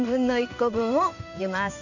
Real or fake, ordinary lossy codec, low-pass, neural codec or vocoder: fake; none; 7.2 kHz; codec, 16 kHz in and 24 kHz out, 1 kbps, XY-Tokenizer